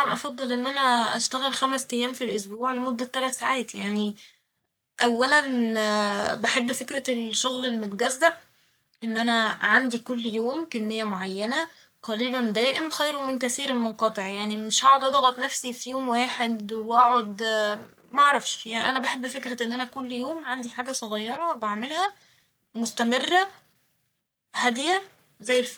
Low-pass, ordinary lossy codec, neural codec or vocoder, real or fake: none; none; codec, 44.1 kHz, 3.4 kbps, Pupu-Codec; fake